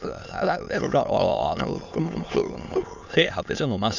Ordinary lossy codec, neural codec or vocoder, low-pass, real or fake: none; autoencoder, 22.05 kHz, a latent of 192 numbers a frame, VITS, trained on many speakers; 7.2 kHz; fake